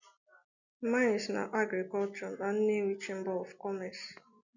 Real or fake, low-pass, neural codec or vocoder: real; 7.2 kHz; none